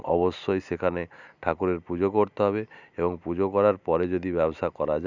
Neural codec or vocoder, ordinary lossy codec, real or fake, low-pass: none; none; real; 7.2 kHz